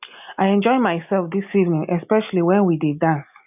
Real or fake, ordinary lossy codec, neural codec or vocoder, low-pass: real; none; none; 3.6 kHz